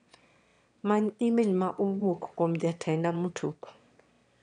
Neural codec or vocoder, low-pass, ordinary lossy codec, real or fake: autoencoder, 22.05 kHz, a latent of 192 numbers a frame, VITS, trained on one speaker; 9.9 kHz; none; fake